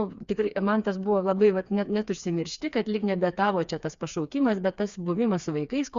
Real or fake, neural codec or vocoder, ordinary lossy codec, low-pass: fake; codec, 16 kHz, 4 kbps, FreqCodec, smaller model; Opus, 64 kbps; 7.2 kHz